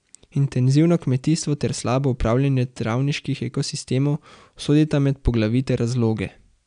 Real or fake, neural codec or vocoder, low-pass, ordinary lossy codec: real; none; 9.9 kHz; none